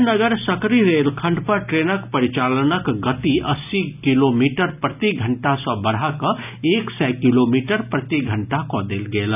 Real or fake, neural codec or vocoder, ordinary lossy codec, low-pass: real; none; none; 3.6 kHz